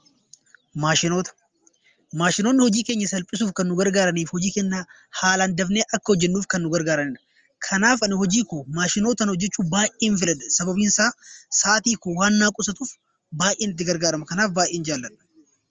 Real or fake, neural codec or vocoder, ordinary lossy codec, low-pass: real; none; Opus, 32 kbps; 7.2 kHz